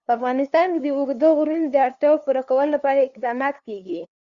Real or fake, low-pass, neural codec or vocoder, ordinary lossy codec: fake; 7.2 kHz; codec, 16 kHz, 2 kbps, FunCodec, trained on LibriTTS, 25 frames a second; Opus, 64 kbps